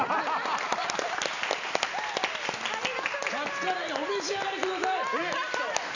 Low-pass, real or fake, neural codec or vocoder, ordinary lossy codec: 7.2 kHz; real; none; none